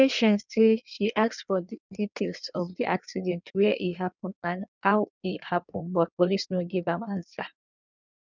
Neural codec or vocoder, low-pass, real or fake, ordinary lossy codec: codec, 16 kHz in and 24 kHz out, 1.1 kbps, FireRedTTS-2 codec; 7.2 kHz; fake; none